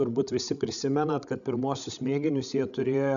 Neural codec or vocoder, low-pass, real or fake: codec, 16 kHz, 16 kbps, FreqCodec, larger model; 7.2 kHz; fake